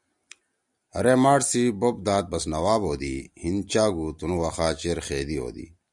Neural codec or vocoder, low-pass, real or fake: none; 10.8 kHz; real